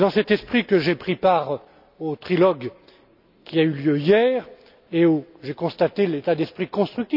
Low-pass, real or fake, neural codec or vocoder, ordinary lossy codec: 5.4 kHz; real; none; none